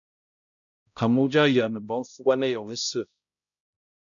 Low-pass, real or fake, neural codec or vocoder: 7.2 kHz; fake; codec, 16 kHz, 0.5 kbps, X-Codec, HuBERT features, trained on balanced general audio